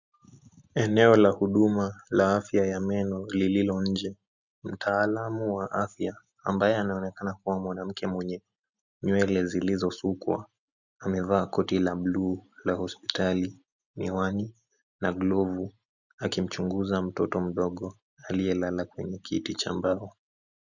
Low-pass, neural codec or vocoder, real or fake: 7.2 kHz; none; real